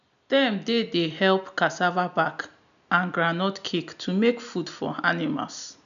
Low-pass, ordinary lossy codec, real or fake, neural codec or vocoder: 7.2 kHz; none; real; none